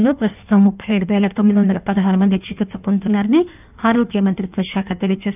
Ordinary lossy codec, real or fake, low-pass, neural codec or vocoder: none; fake; 3.6 kHz; codec, 16 kHz in and 24 kHz out, 1.1 kbps, FireRedTTS-2 codec